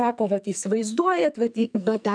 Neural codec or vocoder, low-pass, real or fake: codec, 44.1 kHz, 2.6 kbps, SNAC; 9.9 kHz; fake